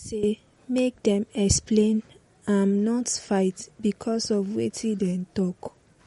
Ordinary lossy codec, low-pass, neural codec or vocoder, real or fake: MP3, 48 kbps; 19.8 kHz; none; real